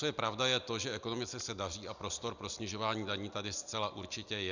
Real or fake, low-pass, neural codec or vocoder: real; 7.2 kHz; none